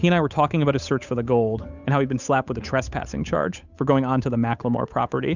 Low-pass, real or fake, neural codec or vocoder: 7.2 kHz; fake; codec, 16 kHz, 8 kbps, FunCodec, trained on Chinese and English, 25 frames a second